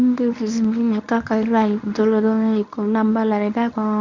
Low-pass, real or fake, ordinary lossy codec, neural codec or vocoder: 7.2 kHz; fake; none; codec, 24 kHz, 0.9 kbps, WavTokenizer, medium speech release version 1